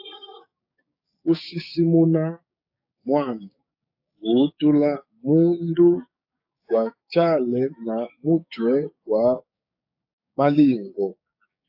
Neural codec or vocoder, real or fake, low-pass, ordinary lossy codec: codec, 16 kHz, 6 kbps, DAC; fake; 5.4 kHz; AAC, 48 kbps